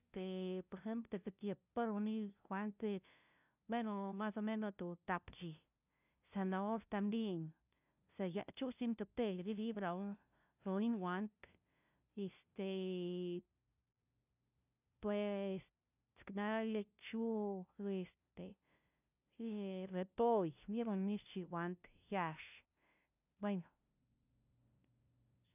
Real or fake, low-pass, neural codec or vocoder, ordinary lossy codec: fake; 3.6 kHz; codec, 16 kHz, 0.5 kbps, FunCodec, trained on LibriTTS, 25 frames a second; none